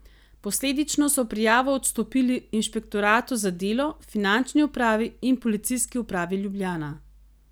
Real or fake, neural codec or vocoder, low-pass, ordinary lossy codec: real; none; none; none